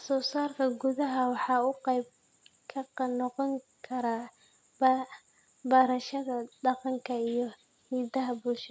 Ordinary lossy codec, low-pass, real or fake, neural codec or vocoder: none; none; real; none